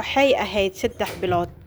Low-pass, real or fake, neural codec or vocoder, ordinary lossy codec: none; real; none; none